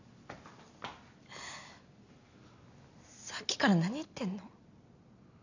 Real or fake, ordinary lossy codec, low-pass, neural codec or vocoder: real; none; 7.2 kHz; none